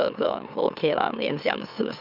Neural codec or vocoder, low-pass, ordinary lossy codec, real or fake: autoencoder, 44.1 kHz, a latent of 192 numbers a frame, MeloTTS; 5.4 kHz; none; fake